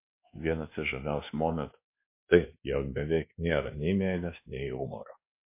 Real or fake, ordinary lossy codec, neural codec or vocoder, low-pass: fake; MP3, 24 kbps; codec, 24 kHz, 1.2 kbps, DualCodec; 3.6 kHz